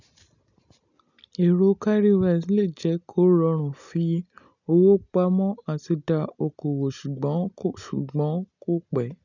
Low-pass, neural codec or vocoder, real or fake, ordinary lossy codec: 7.2 kHz; none; real; none